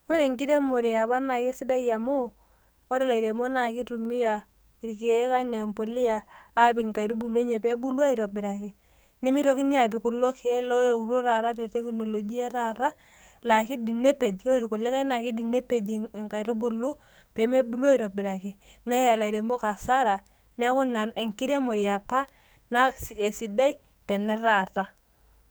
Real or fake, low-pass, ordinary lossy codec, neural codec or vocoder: fake; none; none; codec, 44.1 kHz, 2.6 kbps, SNAC